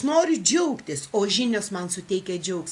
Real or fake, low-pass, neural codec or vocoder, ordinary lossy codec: real; 10.8 kHz; none; AAC, 64 kbps